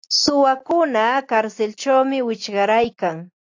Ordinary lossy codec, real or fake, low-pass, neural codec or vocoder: AAC, 48 kbps; real; 7.2 kHz; none